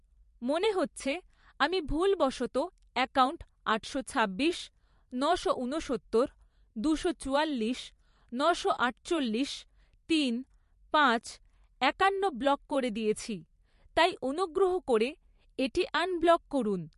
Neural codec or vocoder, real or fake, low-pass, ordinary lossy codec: vocoder, 44.1 kHz, 128 mel bands every 512 samples, BigVGAN v2; fake; 14.4 kHz; MP3, 48 kbps